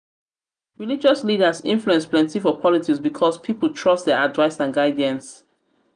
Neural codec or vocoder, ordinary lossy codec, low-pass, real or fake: none; none; 9.9 kHz; real